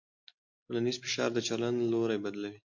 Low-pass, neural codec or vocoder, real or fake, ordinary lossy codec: 7.2 kHz; none; real; MP3, 48 kbps